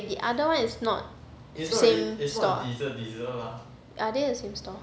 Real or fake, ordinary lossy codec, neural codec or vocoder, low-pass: real; none; none; none